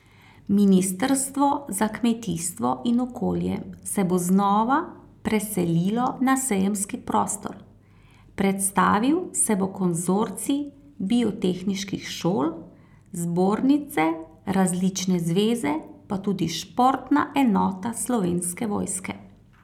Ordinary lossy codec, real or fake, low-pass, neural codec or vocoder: none; real; 19.8 kHz; none